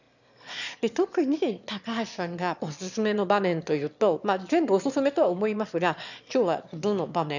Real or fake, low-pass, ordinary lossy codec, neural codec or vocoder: fake; 7.2 kHz; none; autoencoder, 22.05 kHz, a latent of 192 numbers a frame, VITS, trained on one speaker